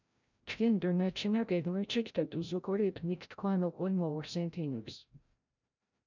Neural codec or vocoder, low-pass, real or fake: codec, 16 kHz, 0.5 kbps, FreqCodec, larger model; 7.2 kHz; fake